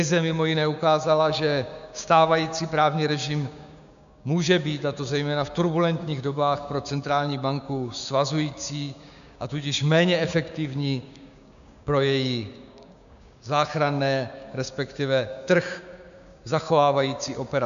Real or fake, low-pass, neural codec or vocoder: fake; 7.2 kHz; codec, 16 kHz, 6 kbps, DAC